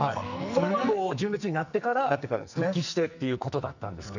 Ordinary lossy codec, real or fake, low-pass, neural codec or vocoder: none; fake; 7.2 kHz; codec, 44.1 kHz, 2.6 kbps, SNAC